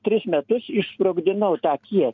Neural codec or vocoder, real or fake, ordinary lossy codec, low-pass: none; real; MP3, 64 kbps; 7.2 kHz